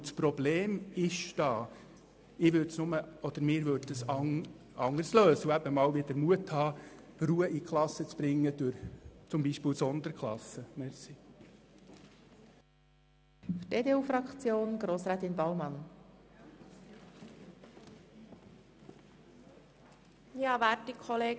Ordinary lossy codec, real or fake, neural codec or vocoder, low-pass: none; real; none; none